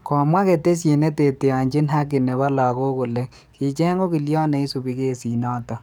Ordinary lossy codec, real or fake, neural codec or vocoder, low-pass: none; fake; codec, 44.1 kHz, 7.8 kbps, DAC; none